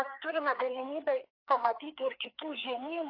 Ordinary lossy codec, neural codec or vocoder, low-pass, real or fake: AAC, 32 kbps; codec, 16 kHz, 4 kbps, FreqCodec, larger model; 5.4 kHz; fake